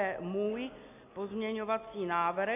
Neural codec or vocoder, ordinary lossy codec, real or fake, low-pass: none; MP3, 32 kbps; real; 3.6 kHz